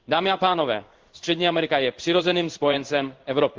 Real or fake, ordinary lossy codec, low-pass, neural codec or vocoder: fake; Opus, 32 kbps; 7.2 kHz; codec, 16 kHz in and 24 kHz out, 1 kbps, XY-Tokenizer